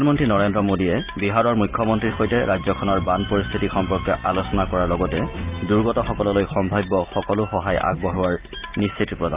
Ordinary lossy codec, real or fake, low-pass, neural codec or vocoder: Opus, 24 kbps; real; 3.6 kHz; none